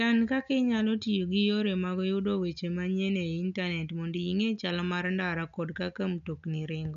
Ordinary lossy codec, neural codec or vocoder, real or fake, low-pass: none; none; real; 7.2 kHz